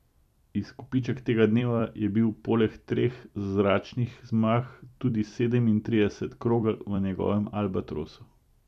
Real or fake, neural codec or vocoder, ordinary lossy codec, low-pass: fake; vocoder, 44.1 kHz, 128 mel bands every 256 samples, BigVGAN v2; none; 14.4 kHz